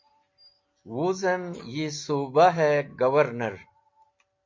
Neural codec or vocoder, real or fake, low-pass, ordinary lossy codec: none; real; 7.2 kHz; MP3, 48 kbps